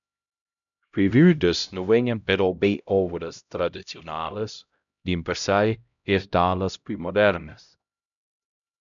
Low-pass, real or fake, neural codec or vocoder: 7.2 kHz; fake; codec, 16 kHz, 0.5 kbps, X-Codec, HuBERT features, trained on LibriSpeech